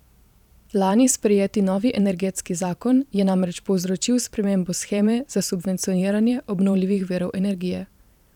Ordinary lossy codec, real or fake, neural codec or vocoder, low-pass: none; fake; vocoder, 44.1 kHz, 128 mel bands every 512 samples, BigVGAN v2; 19.8 kHz